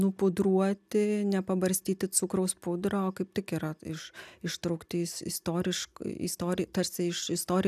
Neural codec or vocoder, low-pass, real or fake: none; 14.4 kHz; real